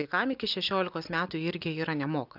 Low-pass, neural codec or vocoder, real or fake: 5.4 kHz; none; real